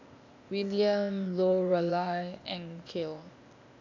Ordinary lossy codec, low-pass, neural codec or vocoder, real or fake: AAC, 48 kbps; 7.2 kHz; codec, 16 kHz, 0.8 kbps, ZipCodec; fake